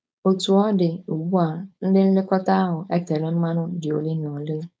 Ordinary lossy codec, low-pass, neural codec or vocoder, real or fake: none; none; codec, 16 kHz, 4.8 kbps, FACodec; fake